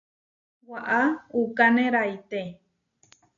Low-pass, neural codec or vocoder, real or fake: 7.2 kHz; none; real